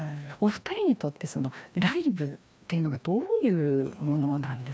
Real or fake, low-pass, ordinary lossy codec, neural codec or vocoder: fake; none; none; codec, 16 kHz, 1 kbps, FreqCodec, larger model